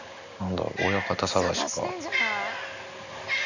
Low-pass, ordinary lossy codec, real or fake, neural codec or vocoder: 7.2 kHz; none; real; none